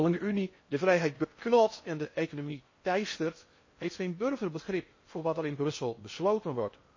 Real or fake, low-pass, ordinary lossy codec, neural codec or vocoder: fake; 7.2 kHz; MP3, 32 kbps; codec, 16 kHz in and 24 kHz out, 0.6 kbps, FocalCodec, streaming, 4096 codes